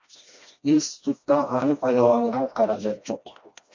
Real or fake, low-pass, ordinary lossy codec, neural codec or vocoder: fake; 7.2 kHz; MP3, 48 kbps; codec, 16 kHz, 1 kbps, FreqCodec, smaller model